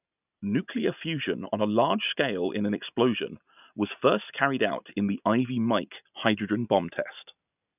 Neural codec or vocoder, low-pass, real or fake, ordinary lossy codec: none; 3.6 kHz; real; none